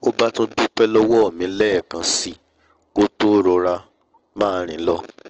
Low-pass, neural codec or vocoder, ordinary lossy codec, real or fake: 7.2 kHz; none; Opus, 16 kbps; real